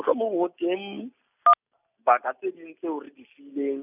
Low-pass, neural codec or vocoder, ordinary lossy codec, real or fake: 3.6 kHz; none; none; real